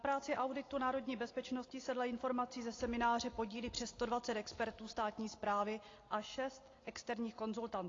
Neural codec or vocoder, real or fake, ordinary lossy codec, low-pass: none; real; AAC, 32 kbps; 7.2 kHz